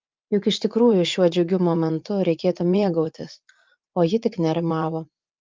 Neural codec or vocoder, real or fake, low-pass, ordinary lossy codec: vocoder, 44.1 kHz, 80 mel bands, Vocos; fake; 7.2 kHz; Opus, 24 kbps